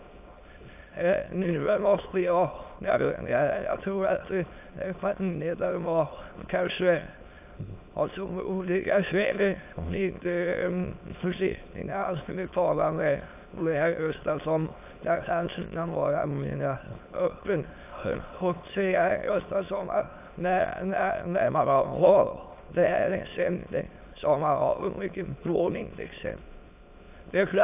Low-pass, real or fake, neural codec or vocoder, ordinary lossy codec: 3.6 kHz; fake; autoencoder, 22.05 kHz, a latent of 192 numbers a frame, VITS, trained on many speakers; AAC, 32 kbps